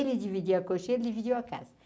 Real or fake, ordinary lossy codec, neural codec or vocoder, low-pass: real; none; none; none